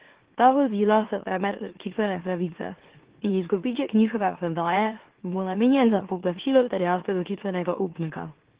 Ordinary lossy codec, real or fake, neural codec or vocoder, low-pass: Opus, 16 kbps; fake; autoencoder, 44.1 kHz, a latent of 192 numbers a frame, MeloTTS; 3.6 kHz